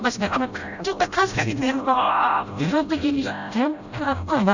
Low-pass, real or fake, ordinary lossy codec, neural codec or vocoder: 7.2 kHz; fake; none; codec, 16 kHz, 0.5 kbps, FreqCodec, smaller model